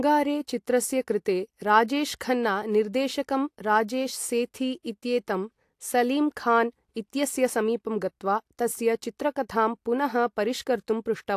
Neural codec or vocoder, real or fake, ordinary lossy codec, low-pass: none; real; AAC, 64 kbps; 14.4 kHz